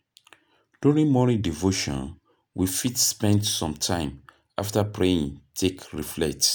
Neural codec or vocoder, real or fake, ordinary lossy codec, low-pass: none; real; none; none